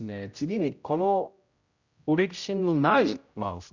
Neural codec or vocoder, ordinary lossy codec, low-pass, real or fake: codec, 16 kHz, 0.5 kbps, X-Codec, HuBERT features, trained on general audio; Opus, 64 kbps; 7.2 kHz; fake